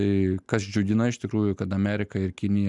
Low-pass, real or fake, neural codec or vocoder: 10.8 kHz; real; none